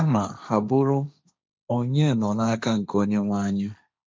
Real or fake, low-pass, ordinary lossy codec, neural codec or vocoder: fake; none; none; codec, 16 kHz, 1.1 kbps, Voila-Tokenizer